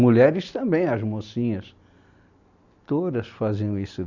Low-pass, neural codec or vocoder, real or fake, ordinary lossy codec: 7.2 kHz; none; real; none